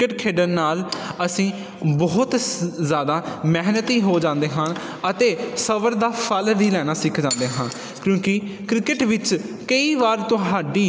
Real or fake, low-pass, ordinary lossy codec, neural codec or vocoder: real; none; none; none